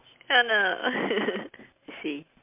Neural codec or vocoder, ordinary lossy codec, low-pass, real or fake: none; MP3, 32 kbps; 3.6 kHz; real